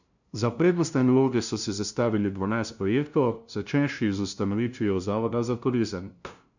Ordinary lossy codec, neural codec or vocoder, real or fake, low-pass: none; codec, 16 kHz, 0.5 kbps, FunCodec, trained on LibriTTS, 25 frames a second; fake; 7.2 kHz